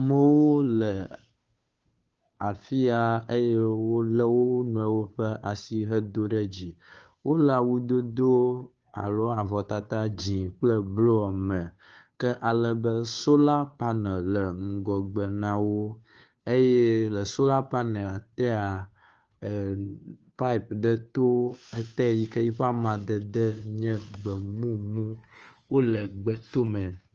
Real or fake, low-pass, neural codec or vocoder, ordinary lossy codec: fake; 7.2 kHz; codec, 16 kHz, 2 kbps, FunCodec, trained on Chinese and English, 25 frames a second; Opus, 24 kbps